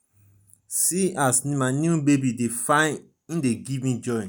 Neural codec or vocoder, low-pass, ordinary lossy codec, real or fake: none; none; none; real